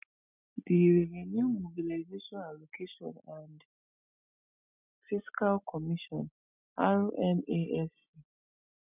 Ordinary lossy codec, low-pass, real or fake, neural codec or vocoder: none; 3.6 kHz; real; none